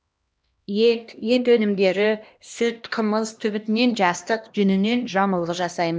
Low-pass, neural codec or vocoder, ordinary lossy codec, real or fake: none; codec, 16 kHz, 1 kbps, X-Codec, HuBERT features, trained on LibriSpeech; none; fake